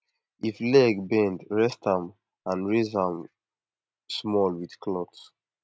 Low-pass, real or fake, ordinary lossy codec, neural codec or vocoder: none; real; none; none